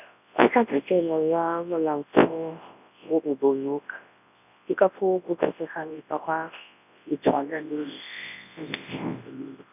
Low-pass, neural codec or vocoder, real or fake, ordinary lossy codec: 3.6 kHz; codec, 24 kHz, 0.9 kbps, WavTokenizer, large speech release; fake; none